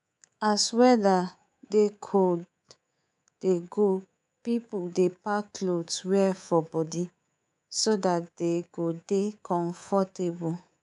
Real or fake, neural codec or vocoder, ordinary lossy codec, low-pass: fake; codec, 24 kHz, 3.1 kbps, DualCodec; none; 10.8 kHz